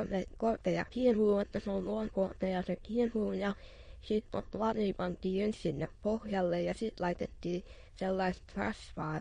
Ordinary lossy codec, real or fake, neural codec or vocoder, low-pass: MP3, 48 kbps; fake; autoencoder, 22.05 kHz, a latent of 192 numbers a frame, VITS, trained on many speakers; 9.9 kHz